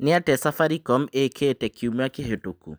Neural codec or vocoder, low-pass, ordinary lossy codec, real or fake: none; none; none; real